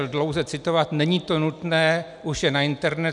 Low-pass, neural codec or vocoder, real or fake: 10.8 kHz; none; real